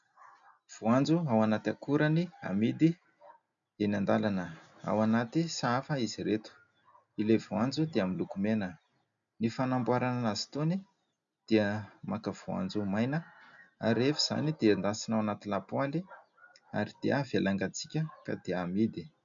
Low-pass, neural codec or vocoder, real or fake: 7.2 kHz; none; real